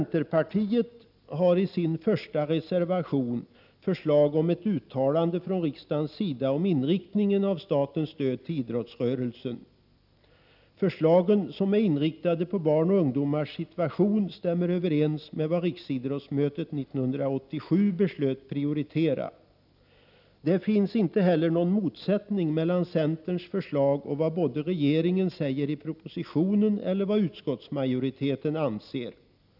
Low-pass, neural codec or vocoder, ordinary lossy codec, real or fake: 5.4 kHz; none; none; real